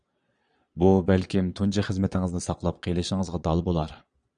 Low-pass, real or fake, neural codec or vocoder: 9.9 kHz; fake; vocoder, 22.05 kHz, 80 mel bands, Vocos